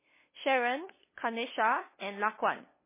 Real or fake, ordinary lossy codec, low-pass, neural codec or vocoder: fake; MP3, 16 kbps; 3.6 kHz; codec, 16 kHz, 2 kbps, FunCodec, trained on LibriTTS, 25 frames a second